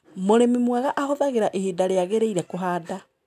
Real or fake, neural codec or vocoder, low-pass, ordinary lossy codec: real; none; 14.4 kHz; none